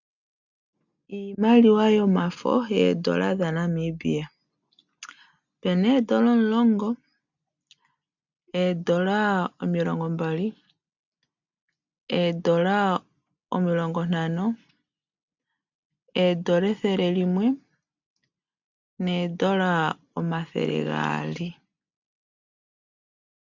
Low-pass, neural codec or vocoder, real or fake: 7.2 kHz; none; real